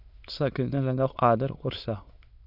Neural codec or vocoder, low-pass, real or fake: autoencoder, 22.05 kHz, a latent of 192 numbers a frame, VITS, trained on many speakers; 5.4 kHz; fake